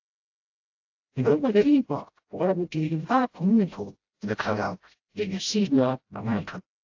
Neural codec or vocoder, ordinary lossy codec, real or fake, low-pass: codec, 16 kHz, 0.5 kbps, FreqCodec, smaller model; AAC, 48 kbps; fake; 7.2 kHz